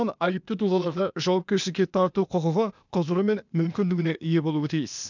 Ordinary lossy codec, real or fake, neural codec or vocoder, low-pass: none; fake; codec, 16 kHz, 0.8 kbps, ZipCodec; 7.2 kHz